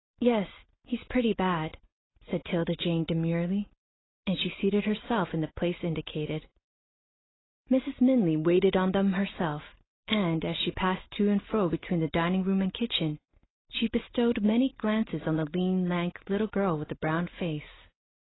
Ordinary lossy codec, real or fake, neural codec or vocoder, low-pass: AAC, 16 kbps; real; none; 7.2 kHz